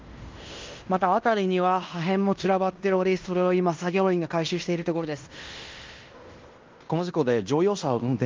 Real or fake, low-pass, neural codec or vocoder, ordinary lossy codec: fake; 7.2 kHz; codec, 16 kHz in and 24 kHz out, 0.9 kbps, LongCat-Audio-Codec, fine tuned four codebook decoder; Opus, 32 kbps